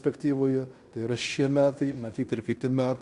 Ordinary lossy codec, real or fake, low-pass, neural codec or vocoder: Opus, 64 kbps; fake; 10.8 kHz; codec, 16 kHz in and 24 kHz out, 0.9 kbps, LongCat-Audio-Codec, fine tuned four codebook decoder